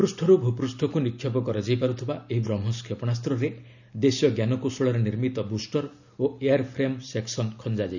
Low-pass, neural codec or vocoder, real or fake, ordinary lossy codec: 7.2 kHz; none; real; none